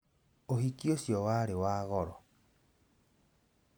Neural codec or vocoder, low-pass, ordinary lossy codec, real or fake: none; none; none; real